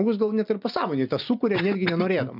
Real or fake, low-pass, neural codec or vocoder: real; 5.4 kHz; none